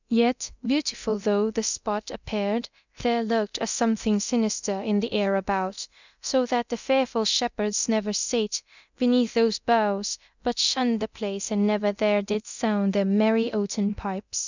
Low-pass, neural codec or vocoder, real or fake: 7.2 kHz; codec, 24 kHz, 0.9 kbps, DualCodec; fake